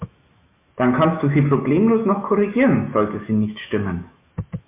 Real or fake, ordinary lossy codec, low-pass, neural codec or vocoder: fake; MP3, 24 kbps; 3.6 kHz; autoencoder, 48 kHz, 128 numbers a frame, DAC-VAE, trained on Japanese speech